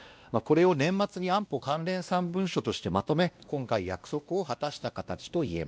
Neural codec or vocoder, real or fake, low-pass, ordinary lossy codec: codec, 16 kHz, 1 kbps, X-Codec, WavLM features, trained on Multilingual LibriSpeech; fake; none; none